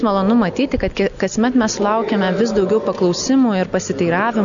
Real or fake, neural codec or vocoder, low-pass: real; none; 7.2 kHz